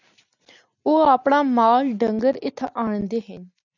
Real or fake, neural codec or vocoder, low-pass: real; none; 7.2 kHz